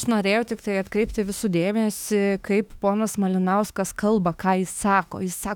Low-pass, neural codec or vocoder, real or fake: 19.8 kHz; autoencoder, 48 kHz, 32 numbers a frame, DAC-VAE, trained on Japanese speech; fake